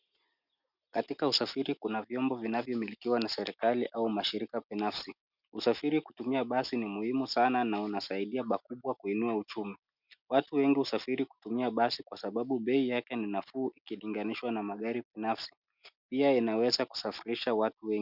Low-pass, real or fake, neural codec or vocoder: 5.4 kHz; real; none